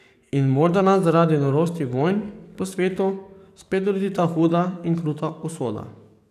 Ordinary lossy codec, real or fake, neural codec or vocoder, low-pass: none; fake; codec, 44.1 kHz, 7.8 kbps, DAC; 14.4 kHz